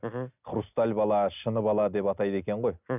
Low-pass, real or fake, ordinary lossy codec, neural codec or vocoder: 3.6 kHz; real; none; none